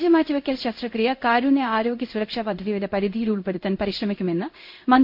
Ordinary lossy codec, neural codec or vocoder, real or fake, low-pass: MP3, 48 kbps; codec, 16 kHz in and 24 kHz out, 1 kbps, XY-Tokenizer; fake; 5.4 kHz